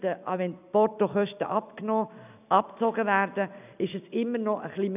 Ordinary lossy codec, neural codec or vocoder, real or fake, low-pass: none; none; real; 3.6 kHz